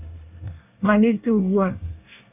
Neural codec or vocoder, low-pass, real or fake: codec, 24 kHz, 1 kbps, SNAC; 3.6 kHz; fake